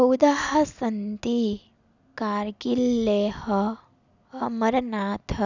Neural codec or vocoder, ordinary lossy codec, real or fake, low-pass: none; none; real; 7.2 kHz